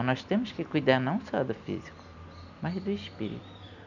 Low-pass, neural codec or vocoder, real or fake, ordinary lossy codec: 7.2 kHz; none; real; none